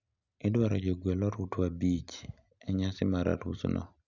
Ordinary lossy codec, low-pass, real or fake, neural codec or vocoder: none; 7.2 kHz; real; none